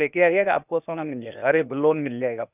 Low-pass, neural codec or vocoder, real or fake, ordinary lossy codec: 3.6 kHz; codec, 16 kHz, 0.8 kbps, ZipCodec; fake; none